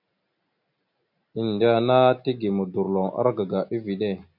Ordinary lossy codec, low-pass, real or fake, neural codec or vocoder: MP3, 48 kbps; 5.4 kHz; real; none